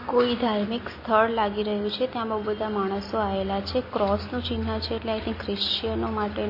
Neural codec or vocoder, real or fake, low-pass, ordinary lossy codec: none; real; 5.4 kHz; MP3, 24 kbps